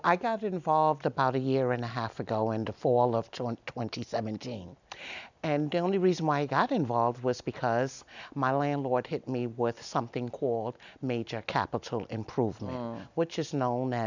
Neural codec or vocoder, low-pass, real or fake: none; 7.2 kHz; real